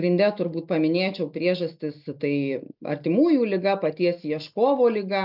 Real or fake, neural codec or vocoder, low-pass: real; none; 5.4 kHz